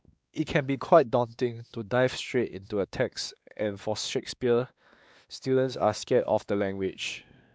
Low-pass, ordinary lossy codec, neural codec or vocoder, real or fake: none; none; codec, 16 kHz, 2 kbps, X-Codec, WavLM features, trained on Multilingual LibriSpeech; fake